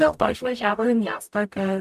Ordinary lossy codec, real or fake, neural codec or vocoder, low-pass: MP3, 96 kbps; fake; codec, 44.1 kHz, 0.9 kbps, DAC; 14.4 kHz